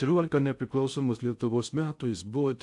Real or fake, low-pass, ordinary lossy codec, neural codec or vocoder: fake; 10.8 kHz; AAC, 64 kbps; codec, 16 kHz in and 24 kHz out, 0.6 kbps, FocalCodec, streaming, 2048 codes